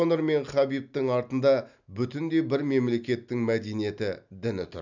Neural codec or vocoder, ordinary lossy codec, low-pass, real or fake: none; none; 7.2 kHz; real